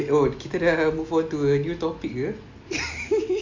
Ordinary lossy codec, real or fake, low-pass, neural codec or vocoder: MP3, 64 kbps; real; 7.2 kHz; none